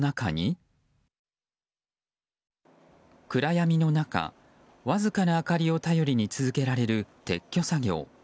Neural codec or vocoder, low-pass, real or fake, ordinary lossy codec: none; none; real; none